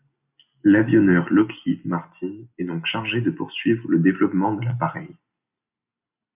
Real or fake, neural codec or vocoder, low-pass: real; none; 3.6 kHz